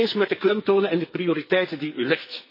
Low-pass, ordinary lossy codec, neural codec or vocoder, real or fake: 5.4 kHz; MP3, 24 kbps; codec, 44.1 kHz, 2.6 kbps, SNAC; fake